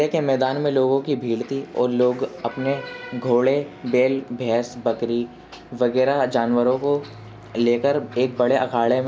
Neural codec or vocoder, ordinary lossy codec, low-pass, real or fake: none; none; none; real